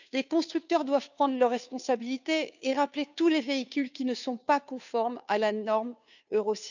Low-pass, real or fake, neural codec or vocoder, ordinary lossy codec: 7.2 kHz; fake; codec, 16 kHz, 2 kbps, FunCodec, trained on Chinese and English, 25 frames a second; none